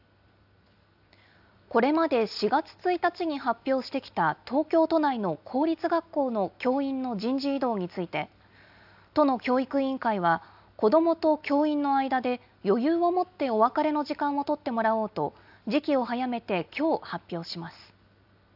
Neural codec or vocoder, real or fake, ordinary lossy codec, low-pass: none; real; AAC, 48 kbps; 5.4 kHz